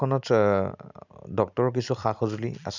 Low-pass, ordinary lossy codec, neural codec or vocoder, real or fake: 7.2 kHz; none; none; real